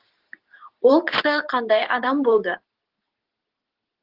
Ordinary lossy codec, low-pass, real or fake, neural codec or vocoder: Opus, 16 kbps; 5.4 kHz; fake; codec, 24 kHz, 0.9 kbps, WavTokenizer, medium speech release version 2